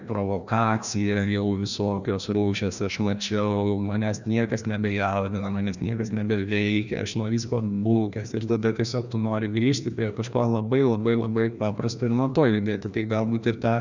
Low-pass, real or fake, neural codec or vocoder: 7.2 kHz; fake; codec, 16 kHz, 1 kbps, FreqCodec, larger model